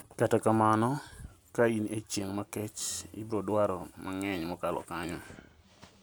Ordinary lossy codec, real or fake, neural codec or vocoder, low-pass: none; real; none; none